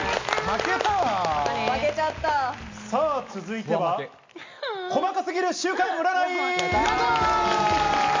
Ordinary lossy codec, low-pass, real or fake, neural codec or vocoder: MP3, 48 kbps; 7.2 kHz; real; none